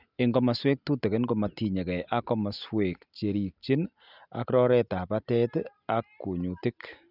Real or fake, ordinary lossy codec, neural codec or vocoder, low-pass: real; none; none; 5.4 kHz